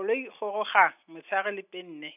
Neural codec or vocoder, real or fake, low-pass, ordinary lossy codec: none; real; 3.6 kHz; none